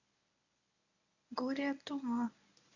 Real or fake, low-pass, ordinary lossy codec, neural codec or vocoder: fake; 7.2 kHz; none; codec, 24 kHz, 0.9 kbps, WavTokenizer, medium speech release version 1